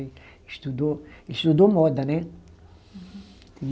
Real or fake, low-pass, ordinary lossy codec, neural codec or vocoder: real; none; none; none